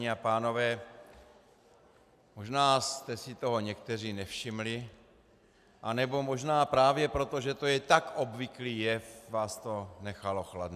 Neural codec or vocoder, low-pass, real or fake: none; 14.4 kHz; real